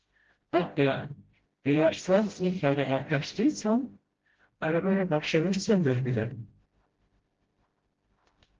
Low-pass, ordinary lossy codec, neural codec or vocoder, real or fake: 7.2 kHz; Opus, 16 kbps; codec, 16 kHz, 0.5 kbps, FreqCodec, smaller model; fake